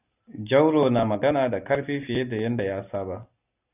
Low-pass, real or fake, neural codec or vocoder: 3.6 kHz; real; none